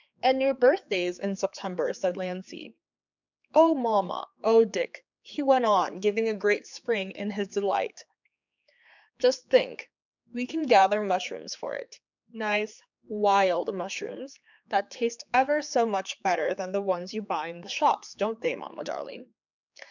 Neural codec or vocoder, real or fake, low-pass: codec, 16 kHz, 4 kbps, X-Codec, HuBERT features, trained on general audio; fake; 7.2 kHz